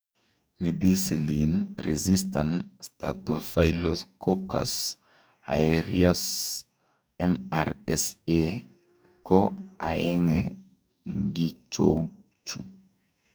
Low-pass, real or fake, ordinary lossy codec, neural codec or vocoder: none; fake; none; codec, 44.1 kHz, 2.6 kbps, DAC